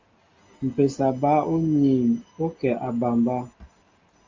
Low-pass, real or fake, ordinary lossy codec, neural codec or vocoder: 7.2 kHz; real; Opus, 32 kbps; none